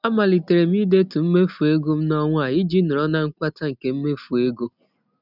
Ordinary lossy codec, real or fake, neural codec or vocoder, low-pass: none; real; none; 5.4 kHz